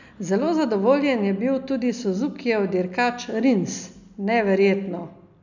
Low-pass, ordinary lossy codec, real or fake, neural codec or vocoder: 7.2 kHz; none; real; none